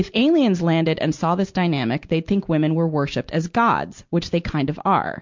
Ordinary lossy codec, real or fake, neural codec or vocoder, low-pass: MP3, 64 kbps; real; none; 7.2 kHz